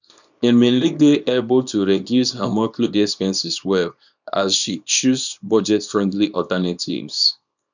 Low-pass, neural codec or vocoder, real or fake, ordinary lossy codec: 7.2 kHz; codec, 24 kHz, 0.9 kbps, WavTokenizer, small release; fake; none